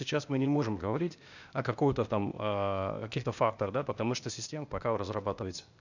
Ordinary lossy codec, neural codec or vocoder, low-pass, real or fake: none; codec, 16 kHz, 0.8 kbps, ZipCodec; 7.2 kHz; fake